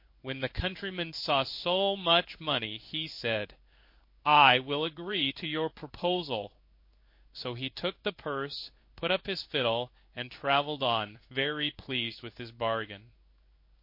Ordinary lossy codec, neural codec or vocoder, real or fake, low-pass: MP3, 32 kbps; codec, 16 kHz in and 24 kHz out, 1 kbps, XY-Tokenizer; fake; 5.4 kHz